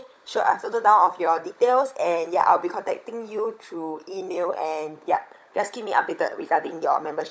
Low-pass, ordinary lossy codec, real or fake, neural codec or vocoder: none; none; fake; codec, 16 kHz, 16 kbps, FunCodec, trained on LibriTTS, 50 frames a second